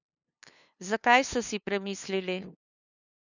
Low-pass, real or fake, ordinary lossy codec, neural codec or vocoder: 7.2 kHz; fake; none; codec, 16 kHz, 2 kbps, FunCodec, trained on LibriTTS, 25 frames a second